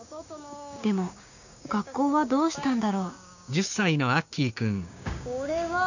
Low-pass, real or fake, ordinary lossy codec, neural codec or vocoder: 7.2 kHz; fake; none; codec, 16 kHz, 6 kbps, DAC